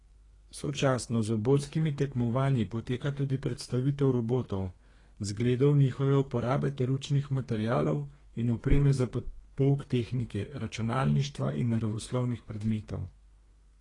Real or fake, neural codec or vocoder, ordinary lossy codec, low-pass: fake; codec, 32 kHz, 1.9 kbps, SNAC; AAC, 32 kbps; 10.8 kHz